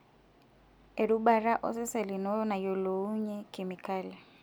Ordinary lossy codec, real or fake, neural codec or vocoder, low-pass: none; real; none; none